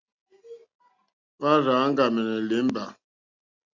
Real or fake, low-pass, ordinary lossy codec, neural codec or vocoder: real; 7.2 kHz; MP3, 64 kbps; none